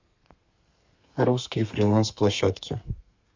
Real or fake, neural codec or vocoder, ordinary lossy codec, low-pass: fake; codec, 32 kHz, 1.9 kbps, SNAC; MP3, 64 kbps; 7.2 kHz